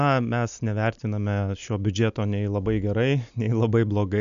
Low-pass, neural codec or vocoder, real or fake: 7.2 kHz; none; real